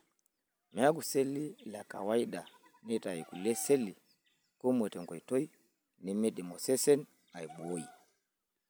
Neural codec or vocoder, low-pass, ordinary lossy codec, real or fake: none; none; none; real